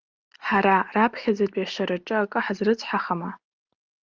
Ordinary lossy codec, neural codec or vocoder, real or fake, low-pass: Opus, 24 kbps; none; real; 7.2 kHz